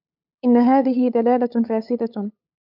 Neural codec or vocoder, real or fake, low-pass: codec, 16 kHz, 8 kbps, FunCodec, trained on LibriTTS, 25 frames a second; fake; 5.4 kHz